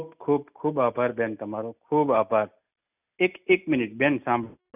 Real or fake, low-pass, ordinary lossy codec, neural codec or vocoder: real; 3.6 kHz; none; none